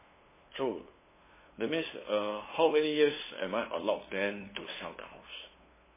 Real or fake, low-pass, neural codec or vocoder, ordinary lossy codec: fake; 3.6 kHz; codec, 16 kHz, 2 kbps, FunCodec, trained on LibriTTS, 25 frames a second; MP3, 16 kbps